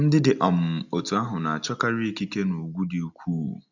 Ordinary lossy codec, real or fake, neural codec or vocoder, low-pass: none; real; none; 7.2 kHz